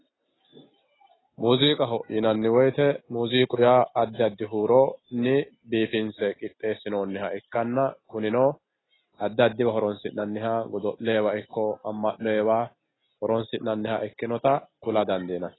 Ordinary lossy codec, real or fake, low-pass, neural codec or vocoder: AAC, 16 kbps; real; 7.2 kHz; none